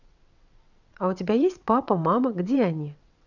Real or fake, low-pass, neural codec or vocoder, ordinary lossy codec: fake; 7.2 kHz; vocoder, 22.05 kHz, 80 mel bands, WaveNeXt; none